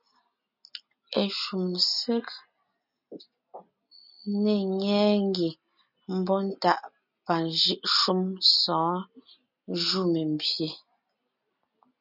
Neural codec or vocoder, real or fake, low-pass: none; real; 5.4 kHz